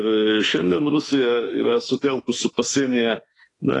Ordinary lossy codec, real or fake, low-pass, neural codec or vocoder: AAC, 32 kbps; fake; 10.8 kHz; autoencoder, 48 kHz, 32 numbers a frame, DAC-VAE, trained on Japanese speech